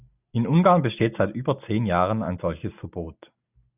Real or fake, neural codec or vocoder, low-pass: real; none; 3.6 kHz